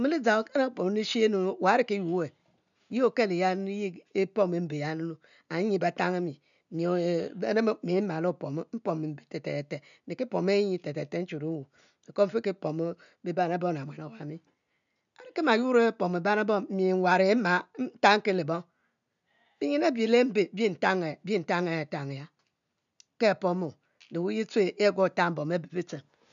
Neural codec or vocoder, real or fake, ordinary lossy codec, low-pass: none; real; none; 7.2 kHz